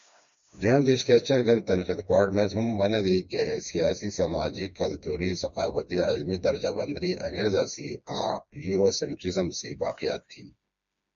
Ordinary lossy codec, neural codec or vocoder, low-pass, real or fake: MP3, 64 kbps; codec, 16 kHz, 2 kbps, FreqCodec, smaller model; 7.2 kHz; fake